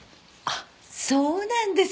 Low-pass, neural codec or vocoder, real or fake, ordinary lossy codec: none; none; real; none